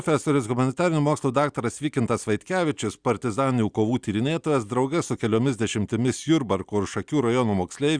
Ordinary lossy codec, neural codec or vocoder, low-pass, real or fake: Opus, 64 kbps; none; 9.9 kHz; real